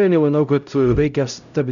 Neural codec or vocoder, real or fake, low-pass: codec, 16 kHz, 0.5 kbps, X-Codec, HuBERT features, trained on LibriSpeech; fake; 7.2 kHz